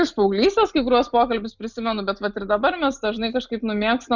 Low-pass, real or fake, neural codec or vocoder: 7.2 kHz; real; none